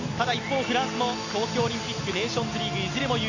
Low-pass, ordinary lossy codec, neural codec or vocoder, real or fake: 7.2 kHz; none; none; real